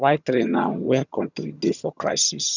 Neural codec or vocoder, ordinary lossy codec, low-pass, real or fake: vocoder, 22.05 kHz, 80 mel bands, HiFi-GAN; none; 7.2 kHz; fake